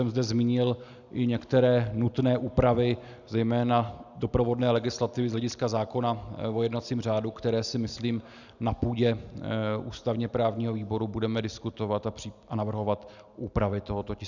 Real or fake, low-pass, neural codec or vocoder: real; 7.2 kHz; none